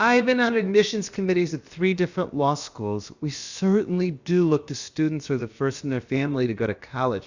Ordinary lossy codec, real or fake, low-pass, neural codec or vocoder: Opus, 64 kbps; fake; 7.2 kHz; codec, 16 kHz, about 1 kbps, DyCAST, with the encoder's durations